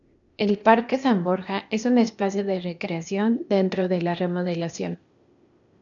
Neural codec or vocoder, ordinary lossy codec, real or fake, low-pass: codec, 16 kHz, 0.8 kbps, ZipCodec; MP3, 96 kbps; fake; 7.2 kHz